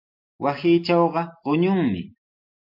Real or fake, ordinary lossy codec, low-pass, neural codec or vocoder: real; AAC, 48 kbps; 5.4 kHz; none